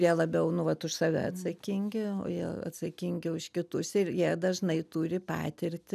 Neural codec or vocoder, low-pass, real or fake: none; 14.4 kHz; real